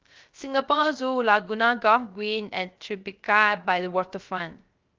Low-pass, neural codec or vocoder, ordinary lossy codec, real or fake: 7.2 kHz; codec, 16 kHz, 0.3 kbps, FocalCodec; Opus, 24 kbps; fake